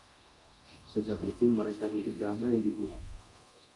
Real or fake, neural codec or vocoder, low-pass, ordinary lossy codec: fake; codec, 24 kHz, 0.9 kbps, DualCodec; 10.8 kHz; Opus, 64 kbps